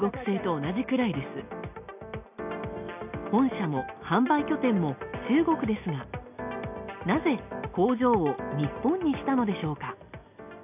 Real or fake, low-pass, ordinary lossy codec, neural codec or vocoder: real; 3.6 kHz; none; none